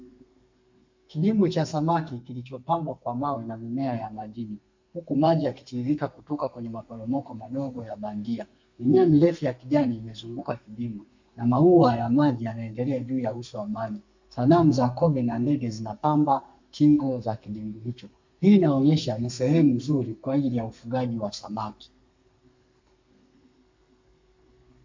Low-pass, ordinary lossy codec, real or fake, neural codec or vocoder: 7.2 kHz; MP3, 48 kbps; fake; codec, 32 kHz, 1.9 kbps, SNAC